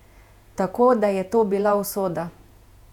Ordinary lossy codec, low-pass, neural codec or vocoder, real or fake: none; 19.8 kHz; vocoder, 48 kHz, 128 mel bands, Vocos; fake